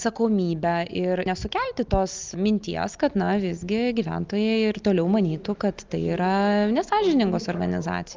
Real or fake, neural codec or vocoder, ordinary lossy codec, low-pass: real; none; Opus, 24 kbps; 7.2 kHz